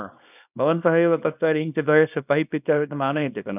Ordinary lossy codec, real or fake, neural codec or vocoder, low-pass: none; fake; codec, 24 kHz, 0.9 kbps, WavTokenizer, small release; 3.6 kHz